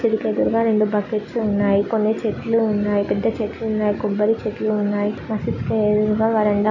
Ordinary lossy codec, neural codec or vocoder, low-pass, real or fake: none; none; 7.2 kHz; real